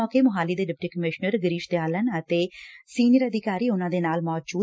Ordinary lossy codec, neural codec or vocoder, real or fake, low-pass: none; none; real; none